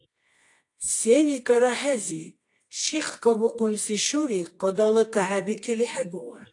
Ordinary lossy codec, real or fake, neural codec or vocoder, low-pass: AAC, 48 kbps; fake; codec, 24 kHz, 0.9 kbps, WavTokenizer, medium music audio release; 10.8 kHz